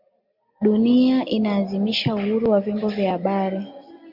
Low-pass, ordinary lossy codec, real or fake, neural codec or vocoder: 5.4 kHz; MP3, 48 kbps; real; none